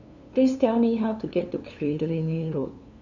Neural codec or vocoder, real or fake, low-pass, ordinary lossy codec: codec, 16 kHz, 2 kbps, FunCodec, trained on LibriTTS, 25 frames a second; fake; 7.2 kHz; none